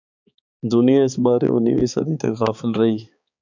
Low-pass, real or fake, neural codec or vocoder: 7.2 kHz; fake; codec, 16 kHz, 4 kbps, X-Codec, HuBERT features, trained on balanced general audio